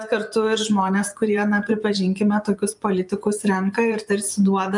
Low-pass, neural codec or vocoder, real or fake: 10.8 kHz; vocoder, 24 kHz, 100 mel bands, Vocos; fake